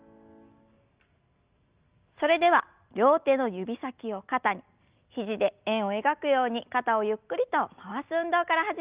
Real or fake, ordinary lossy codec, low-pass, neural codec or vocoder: real; Opus, 24 kbps; 3.6 kHz; none